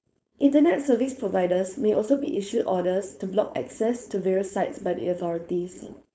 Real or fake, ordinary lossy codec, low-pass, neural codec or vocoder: fake; none; none; codec, 16 kHz, 4.8 kbps, FACodec